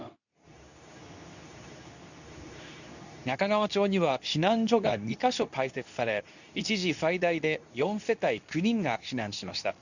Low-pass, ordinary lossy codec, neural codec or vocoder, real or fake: 7.2 kHz; none; codec, 24 kHz, 0.9 kbps, WavTokenizer, medium speech release version 2; fake